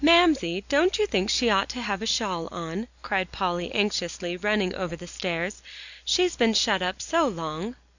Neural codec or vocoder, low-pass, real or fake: none; 7.2 kHz; real